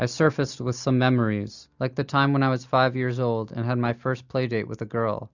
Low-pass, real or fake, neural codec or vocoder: 7.2 kHz; real; none